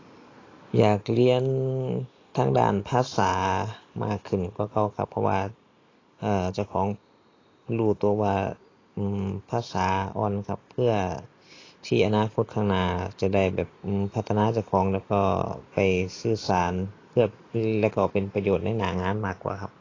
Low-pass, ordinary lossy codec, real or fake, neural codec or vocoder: 7.2 kHz; AAC, 32 kbps; real; none